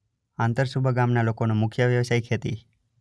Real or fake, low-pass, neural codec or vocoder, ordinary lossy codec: real; none; none; none